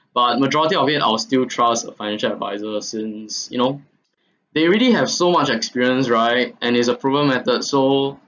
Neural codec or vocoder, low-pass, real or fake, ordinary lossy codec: none; 7.2 kHz; real; none